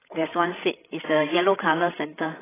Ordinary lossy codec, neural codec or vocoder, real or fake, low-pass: AAC, 16 kbps; codec, 16 kHz, 16 kbps, FreqCodec, larger model; fake; 3.6 kHz